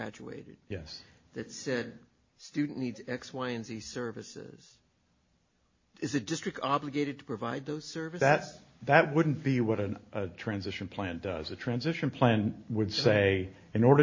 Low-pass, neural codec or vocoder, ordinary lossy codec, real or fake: 7.2 kHz; none; MP3, 32 kbps; real